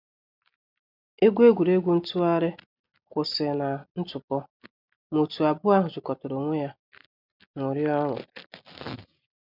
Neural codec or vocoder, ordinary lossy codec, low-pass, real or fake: none; none; 5.4 kHz; real